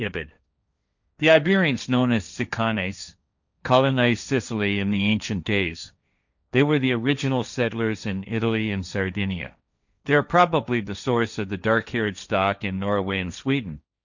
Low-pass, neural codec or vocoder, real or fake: 7.2 kHz; codec, 16 kHz, 1.1 kbps, Voila-Tokenizer; fake